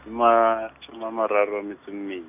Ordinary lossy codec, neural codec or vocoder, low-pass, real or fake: none; none; 3.6 kHz; real